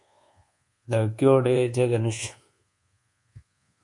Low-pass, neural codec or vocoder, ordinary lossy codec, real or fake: 10.8 kHz; codec, 24 kHz, 1.2 kbps, DualCodec; MP3, 48 kbps; fake